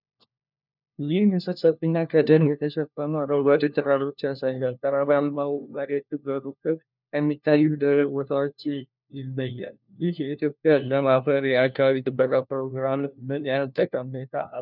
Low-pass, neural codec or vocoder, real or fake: 5.4 kHz; codec, 16 kHz, 1 kbps, FunCodec, trained on LibriTTS, 50 frames a second; fake